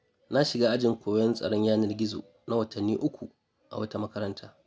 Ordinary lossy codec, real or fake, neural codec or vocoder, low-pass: none; real; none; none